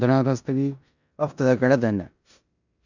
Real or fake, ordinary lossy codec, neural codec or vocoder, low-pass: fake; none; codec, 16 kHz in and 24 kHz out, 0.9 kbps, LongCat-Audio-Codec, four codebook decoder; 7.2 kHz